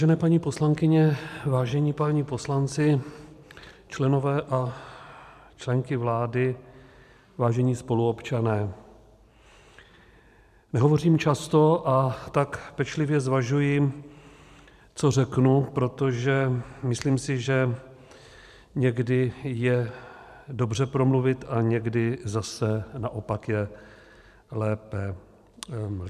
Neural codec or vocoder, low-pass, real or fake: vocoder, 44.1 kHz, 128 mel bands every 512 samples, BigVGAN v2; 14.4 kHz; fake